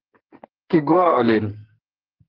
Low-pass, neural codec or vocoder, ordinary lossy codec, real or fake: 5.4 kHz; codec, 44.1 kHz, 2.6 kbps, SNAC; Opus, 16 kbps; fake